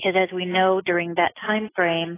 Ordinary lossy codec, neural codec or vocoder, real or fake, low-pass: AAC, 16 kbps; none; real; 3.6 kHz